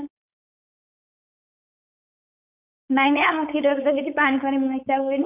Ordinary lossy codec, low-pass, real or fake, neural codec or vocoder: none; 3.6 kHz; fake; codec, 16 kHz, 4.8 kbps, FACodec